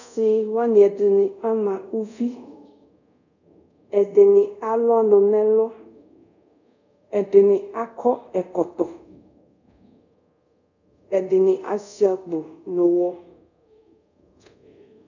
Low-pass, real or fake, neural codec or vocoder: 7.2 kHz; fake; codec, 24 kHz, 0.5 kbps, DualCodec